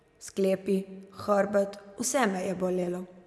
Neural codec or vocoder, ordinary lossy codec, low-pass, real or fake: none; none; none; real